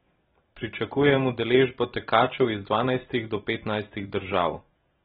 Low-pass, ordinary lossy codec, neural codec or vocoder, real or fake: 19.8 kHz; AAC, 16 kbps; none; real